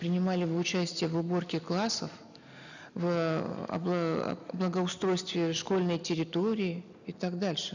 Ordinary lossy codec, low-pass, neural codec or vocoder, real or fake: none; 7.2 kHz; none; real